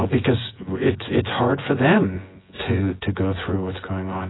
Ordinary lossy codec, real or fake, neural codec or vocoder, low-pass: AAC, 16 kbps; fake; vocoder, 24 kHz, 100 mel bands, Vocos; 7.2 kHz